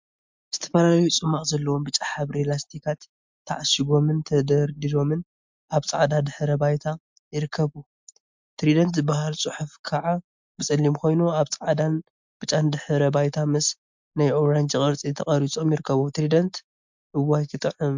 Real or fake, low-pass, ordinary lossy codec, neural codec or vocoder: real; 7.2 kHz; MP3, 64 kbps; none